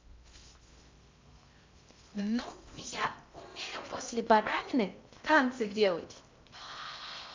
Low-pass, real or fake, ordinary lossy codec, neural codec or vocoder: 7.2 kHz; fake; MP3, 64 kbps; codec, 16 kHz in and 24 kHz out, 0.6 kbps, FocalCodec, streaming, 2048 codes